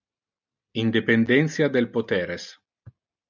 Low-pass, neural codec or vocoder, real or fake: 7.2 kHz; none; real